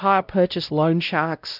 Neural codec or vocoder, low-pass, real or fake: codec, 16 kHz, 0.5 kbps, X-Codec, WavLM features, trained on Multilingual LibriSpeech; 5.4 kHz; fake